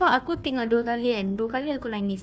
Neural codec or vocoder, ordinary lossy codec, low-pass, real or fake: codec, 16 kHz, 1 kbps, FunCodec, trained on Chinese and English, 50 frames a second; none; none; fake